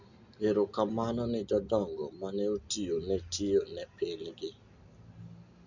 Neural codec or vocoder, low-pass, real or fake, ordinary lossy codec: none; 7.2 kHz; real; none